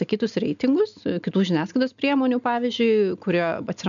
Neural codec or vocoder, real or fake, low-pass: none; real; 7.2 kHz